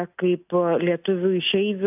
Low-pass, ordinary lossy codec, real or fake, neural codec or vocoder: 3.6 kHz; AAC, 32 kbps; real; none